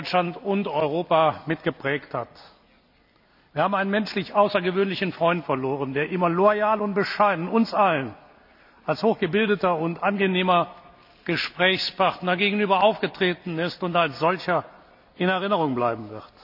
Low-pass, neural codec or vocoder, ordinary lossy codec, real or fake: 5.4 kHz; none; none; real